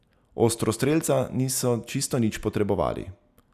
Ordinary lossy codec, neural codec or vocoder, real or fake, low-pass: none; none; real; 14.4 kHz